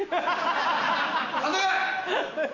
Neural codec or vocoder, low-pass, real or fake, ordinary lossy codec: none; 7.2 kHz; real; none